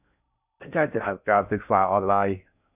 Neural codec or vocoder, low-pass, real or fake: codec, 16 kHz in and 24 kHz out, 0.6 kbps, FocalCodec, streaming, 4096 codes; 3.6 kHz; fake